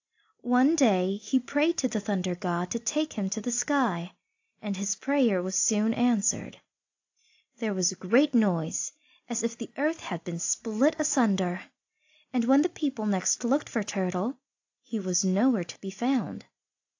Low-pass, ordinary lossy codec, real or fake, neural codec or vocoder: 7.2 kHz; AAC, 48 kbps; real; none